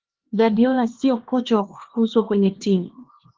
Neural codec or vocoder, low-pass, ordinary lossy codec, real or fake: codec, 16 kHz, 2 kbps, X-Codec, HuBERT features, trained on LibriSpeech; 7.2 kHz; Opus, 16 kbps; fake